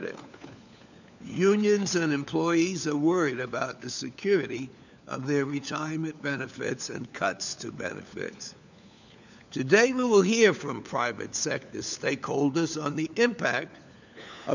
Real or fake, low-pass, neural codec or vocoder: fake; 7.2 kHz; codec, 16 kHz, 4 kbps, FunCodec, trained on LibriTTS, 50 frames a second